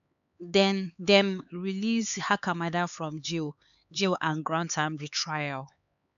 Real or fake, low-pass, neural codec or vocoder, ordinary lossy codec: fake; 7.2 kHz; codec, 16 kHz, 4 kbps, X-Codec, HuBERT features, trained on LibriSpeech; none